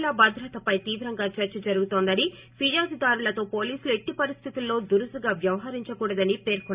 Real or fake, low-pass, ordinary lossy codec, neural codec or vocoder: real; 3.6 kHz; Opus, 64 kbps; none